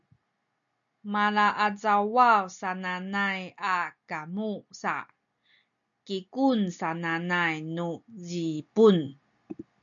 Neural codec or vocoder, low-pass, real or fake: none; 7.2 kHz; real